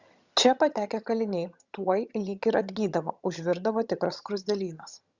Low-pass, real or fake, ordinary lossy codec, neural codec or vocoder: 7.2 kHz; fake; Opus, 64 kbps; vocoder, 22.05 kHz, 80 mel bands, HiFi-GAN